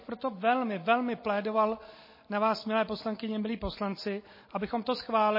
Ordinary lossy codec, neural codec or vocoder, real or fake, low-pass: MP3, 24 kbps; none; real; 5.4 kHz